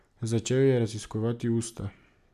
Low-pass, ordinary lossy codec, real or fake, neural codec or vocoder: 14.4 kHz; AAC, 96 kbps; real; none